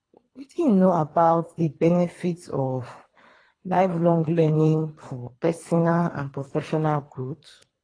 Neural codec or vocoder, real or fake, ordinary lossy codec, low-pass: codec, 24 kHz, 3 kbps, HILCodec; fake; AAC, 32 kbps; 9.9 kHz